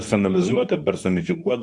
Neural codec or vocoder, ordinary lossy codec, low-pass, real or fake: codec, 24 kHz, 0.9 kbps, WavTokenizer, medium speech release version 2; AAC, 64 kbps; 10.8 kHz; fake